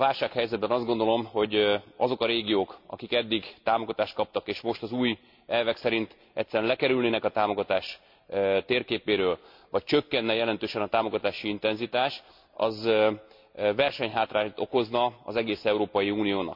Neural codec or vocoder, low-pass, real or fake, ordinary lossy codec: none; 5.4 kHz; real; MP3, 48 kbps